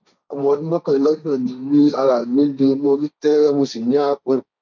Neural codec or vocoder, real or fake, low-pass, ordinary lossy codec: codec, 16 kHz, 1.1 kbps, Voila-Tokenizer; fake; 7.2 kHz; none